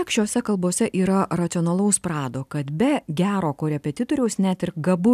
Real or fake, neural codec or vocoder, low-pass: real; none; 14.4 kHz